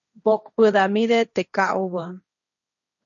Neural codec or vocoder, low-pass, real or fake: codec, 16 kHz, 1.1 kbps, Voila-Tokenizer; 7.2 kHz; fake